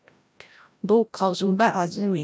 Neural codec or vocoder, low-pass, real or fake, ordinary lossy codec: codec, 16 kHz, 0.5 kbps, FreqCodec, larger model; none; fake; none